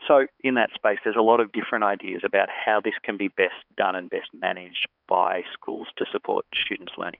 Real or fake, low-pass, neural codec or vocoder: fake; 5.4 kHz; codec, 16 kHz, 4 kbps, X-Codec, HuBERT features, trained on balanced general audio